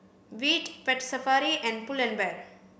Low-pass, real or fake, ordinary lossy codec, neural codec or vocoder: none; real; none; none